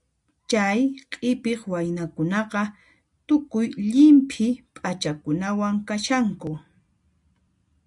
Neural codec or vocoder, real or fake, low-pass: none; real; 10.8 kHz